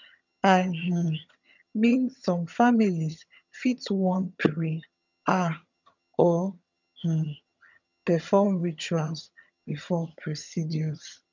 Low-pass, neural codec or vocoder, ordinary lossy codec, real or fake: 7.2 kHz; vocoder, 22.05 kHz, 80 mel bands, HiFi-GAN; none; fake